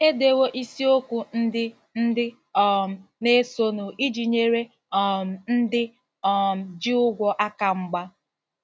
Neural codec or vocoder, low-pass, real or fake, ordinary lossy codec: none; none; real; none